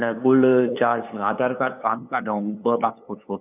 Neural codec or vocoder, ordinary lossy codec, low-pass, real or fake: codec, 16 kHz, 2 kbps, FunCodec, trained on LibriTTS, 25 frames a second; none; 3.6 kHz; fake